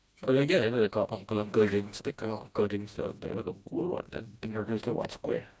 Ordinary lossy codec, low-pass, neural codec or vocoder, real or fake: none; none; codec, 16 kHz, 1 kbps, FreqCodec, smaller model; fake